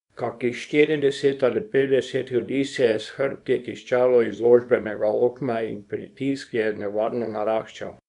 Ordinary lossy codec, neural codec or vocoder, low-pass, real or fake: none; codec, 24 kHz, 0.9 kbps, WavTokenizer, small release; 10.8 kHz; fake